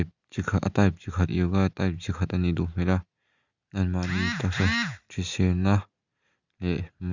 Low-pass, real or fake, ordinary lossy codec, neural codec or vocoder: 7.2 kHz; real; Opus, 64 kbps; none